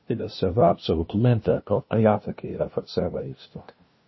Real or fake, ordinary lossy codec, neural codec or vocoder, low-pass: fake; MP3, 24 kbps; codec, 16 kHz, 0.5 kbps, FunCodec, trained on LibriTTS, 25 frames a second; 7.2 kHz